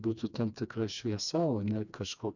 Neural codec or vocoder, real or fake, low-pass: codec, 16 kHz, 2 kbps, FreqCodec, smaller model; fake; 7.2 kHz